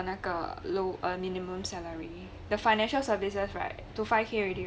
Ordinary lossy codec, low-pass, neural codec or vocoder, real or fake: none; none; none; real